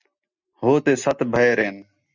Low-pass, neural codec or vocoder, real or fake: 7.2 kHz; none; real